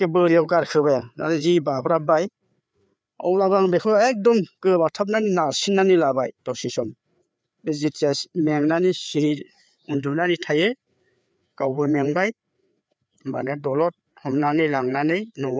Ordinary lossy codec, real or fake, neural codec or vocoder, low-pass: none; fake; codec, 16 kHz, 4 kbps, FreqCodec, larger model; none